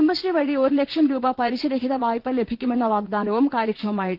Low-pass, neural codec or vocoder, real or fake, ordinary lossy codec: 5.4 kHz; vocoder, 44.1 kHz, 80 mel bands, Vocos; fake; Opus, 16 kbps